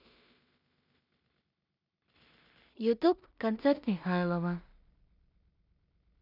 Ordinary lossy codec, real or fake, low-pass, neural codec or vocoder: none; fake; 5.4 kHz; codec, 16 kHz in and 24 kHz out, 0.4 kbps, LongCat-Audio-Codec, two codebook decoder